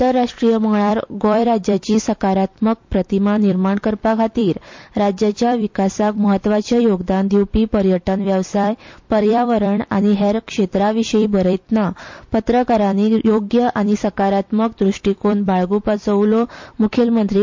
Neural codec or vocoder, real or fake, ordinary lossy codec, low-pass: vocoder, 44.1 kHz, 128 mel bands every 256 samples, BigVGAN v2; fake; MP3, 48 kbps; 7.2 kHz